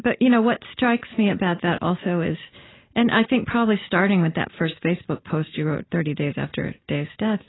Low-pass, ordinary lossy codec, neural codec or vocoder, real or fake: 7.2 kHz; AAC, 16 kbps; none; real